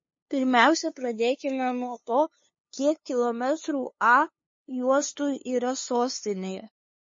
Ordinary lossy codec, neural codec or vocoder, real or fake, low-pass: MP3, 32 kbps; codec, 16 kHz, 2 kbps, FunCodec, trained on LibriTTS, 25 frames a second; fake; 7.2 kHz